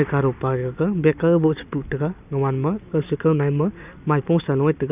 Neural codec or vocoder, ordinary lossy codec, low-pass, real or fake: none; none; 3.6 kHz; real